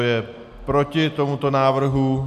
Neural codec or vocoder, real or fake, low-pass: none; real; 14.4 kHz